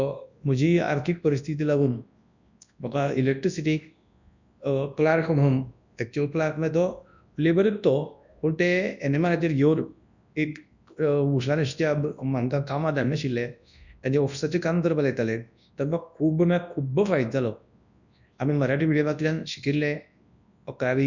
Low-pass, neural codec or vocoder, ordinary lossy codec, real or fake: 7.2 kHz; codec, 24 kHz, 0.9 kbps, WavTokenizer, large speech release; none; fake